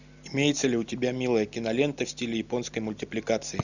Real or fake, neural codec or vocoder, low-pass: real; none; 7.2 kHz